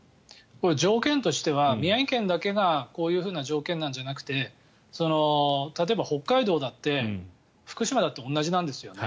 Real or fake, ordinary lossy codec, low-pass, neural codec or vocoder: real; none; none; none